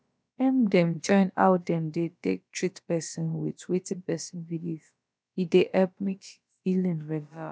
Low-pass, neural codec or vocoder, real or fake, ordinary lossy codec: none; codec, 16 kHz, about 1 kbps, DyCAST, with the encoder's durations; fake; none